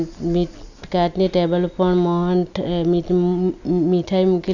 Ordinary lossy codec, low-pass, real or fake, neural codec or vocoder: none; 7.2 kHz; real; none